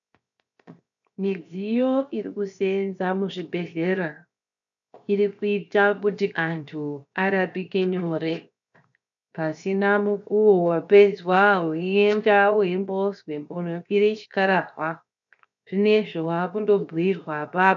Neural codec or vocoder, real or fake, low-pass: codec, 16 kHz, 0.7 kbps, FocalCodec; fake; 7.2 kHz